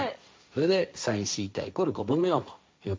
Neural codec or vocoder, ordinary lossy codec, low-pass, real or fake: codec, 16 kHz, 1.1 kbps, Voila-Tokenizer; none; none; fake